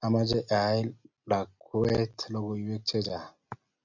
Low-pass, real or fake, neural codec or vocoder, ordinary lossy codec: 7.2 kHz; real; none; MP3, 64 kbps